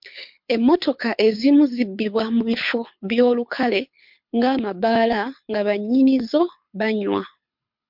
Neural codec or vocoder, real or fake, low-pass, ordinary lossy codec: codec, 24 kHz, 6 kbps, HILCodec; fake; 5.4 kHz; MP3, 48 kbps